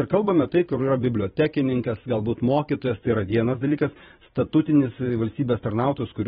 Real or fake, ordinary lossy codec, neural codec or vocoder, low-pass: fake; AAC, 16 kbps; vocoder, 44.1 kHz, 128 mel bands every 512 samples, BigVGAN v2; 19.8 kHz